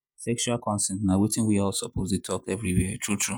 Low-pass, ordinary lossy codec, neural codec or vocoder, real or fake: none; none; vocoder, 48 kHz, 128 mel bands, Vocos; fake